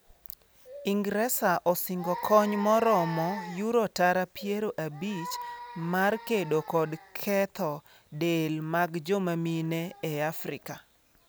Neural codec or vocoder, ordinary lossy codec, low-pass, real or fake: none; none; none; real